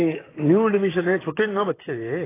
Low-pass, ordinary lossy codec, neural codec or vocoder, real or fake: 3.6 kHz; AAC, 16 kbps; vocoder, 44.1 kHz, 128 mel bands, Pupu-Vocoder; fake